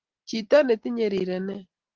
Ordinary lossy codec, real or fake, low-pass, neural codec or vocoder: Opus, 16 kbps; real; 7.2 kHz; none